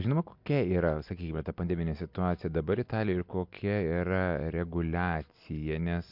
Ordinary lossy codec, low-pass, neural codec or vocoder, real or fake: MP3, 48 kbps; 5.4 kHz; none; real